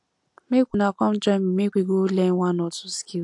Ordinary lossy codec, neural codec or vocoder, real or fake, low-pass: AAC, 64 kbps; none; real; 10.8 kHz